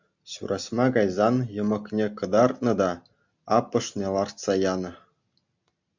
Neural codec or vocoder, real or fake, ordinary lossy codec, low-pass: none; real; MP3, 64 kbps; 7.2 kHz